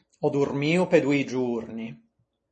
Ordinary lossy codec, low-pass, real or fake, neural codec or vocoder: MP3, 32 kbps; 10.8 kHz; real; none